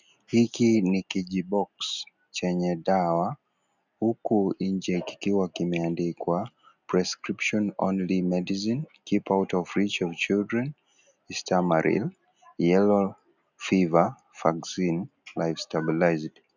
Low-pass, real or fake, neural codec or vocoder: 7.2 kHz; real; none